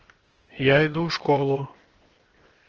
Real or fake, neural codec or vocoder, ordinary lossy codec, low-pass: fake; codec, 16 kHz, 0.8 kbps, ZipCodec; Opus, 16 kbps; 7.2 kHz